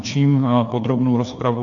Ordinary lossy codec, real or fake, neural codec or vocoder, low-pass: AAC, 48 kbps; fake; codec, 16 kHz, 2 kbps, FreqCodec, larger model; 7.2 kHz